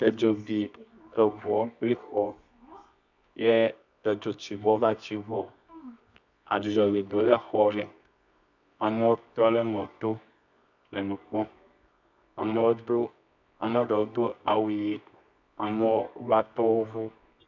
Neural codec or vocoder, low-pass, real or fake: codec, 24 kHz, 0.9 kbps, WavTokenizer, medium music audio release; 7.2 kHz; fake